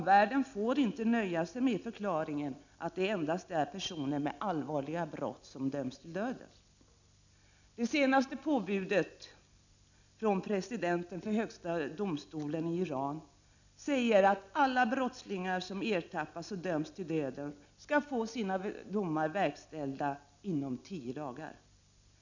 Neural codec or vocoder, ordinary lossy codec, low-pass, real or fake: none; none; 7.2 kHz; real